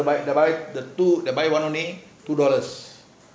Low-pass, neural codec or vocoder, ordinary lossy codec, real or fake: none; none; none; real